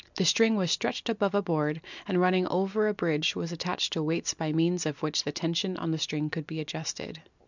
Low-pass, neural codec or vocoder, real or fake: 7.2 kHz; none; real